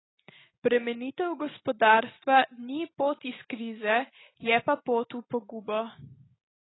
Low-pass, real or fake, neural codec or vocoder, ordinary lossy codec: 7.2 kHz; real; none; AAC, 16 kbps